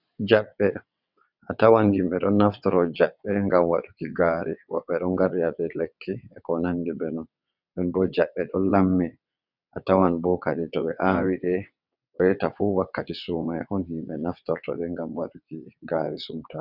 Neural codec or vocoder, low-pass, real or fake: vocoder, 22.05 kHz, 80 mel bands, WaveNeXt; 5.4 kHz; fake